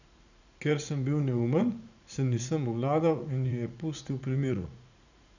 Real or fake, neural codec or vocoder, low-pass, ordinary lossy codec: fake; vocoder, 44.1 kHz, 80 mel bands, Vocos; 7.2 kHz; none